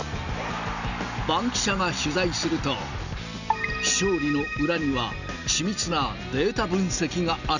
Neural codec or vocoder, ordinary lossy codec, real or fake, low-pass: none; none; real; 7.2 kHz